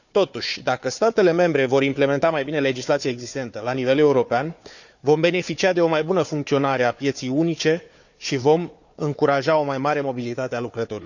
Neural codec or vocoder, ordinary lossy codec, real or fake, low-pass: codec, 16 kHz, 4 kbps, FunCodec, trained on Chinese and English, 50 frames a second; none; fake; 7.2 kHz